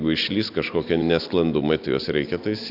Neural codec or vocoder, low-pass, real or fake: none; 5.4 kHz; real